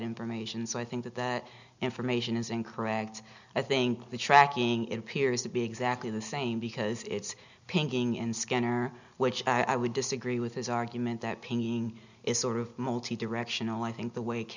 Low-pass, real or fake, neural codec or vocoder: 7.2 kHz; real; none